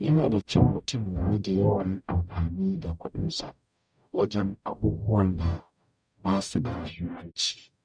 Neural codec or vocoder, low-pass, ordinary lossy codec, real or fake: codec, 44.1 kHz, 0.9 kbps, DAC; 9.9 kHz; none; fake